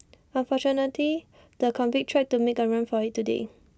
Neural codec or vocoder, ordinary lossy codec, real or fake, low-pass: none; none; real; none